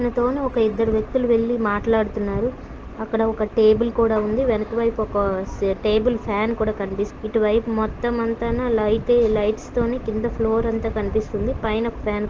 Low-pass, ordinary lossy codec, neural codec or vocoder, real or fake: 7.2 kHz; Opus, 16 kbps; none; real